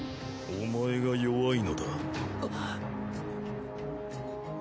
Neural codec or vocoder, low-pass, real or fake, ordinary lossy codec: none; none; real; none